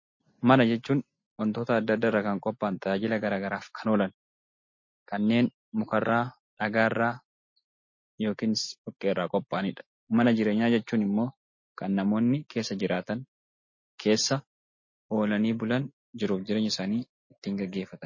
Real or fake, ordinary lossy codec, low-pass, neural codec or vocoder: real; MP3, 32 kbps; 7.2 kHz; none